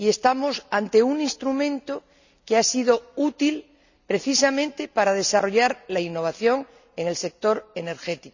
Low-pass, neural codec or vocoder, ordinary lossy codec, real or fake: 7.2 kHz; none; none; real